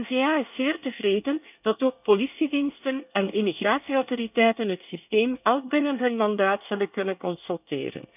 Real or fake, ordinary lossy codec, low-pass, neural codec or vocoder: fake; none; 3.6 kHz; codec, 24 kHz, 1 kbps, SNAC